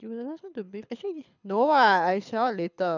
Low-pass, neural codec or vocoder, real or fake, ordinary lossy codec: 7.2 kHz; codec, 24 kHz, 6 kbps, HILCodec; fake; none